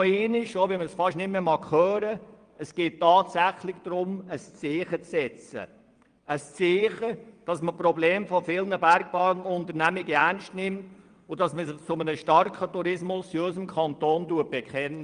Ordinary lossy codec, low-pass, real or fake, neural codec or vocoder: Opus, 32 kbps; 9.9 kHz; fake; vocoder, 22.05 kHz, 80 mel bands, WaveNeXt